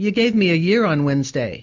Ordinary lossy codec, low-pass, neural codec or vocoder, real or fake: MP3, 64 kbps; 7.2 kHz; none; real